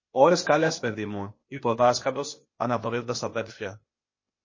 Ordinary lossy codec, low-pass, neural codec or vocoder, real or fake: MP3, 32 kbps; 7.2 kHz; codec, 16 kHz, 0.8 kbps, ZipCodec; fake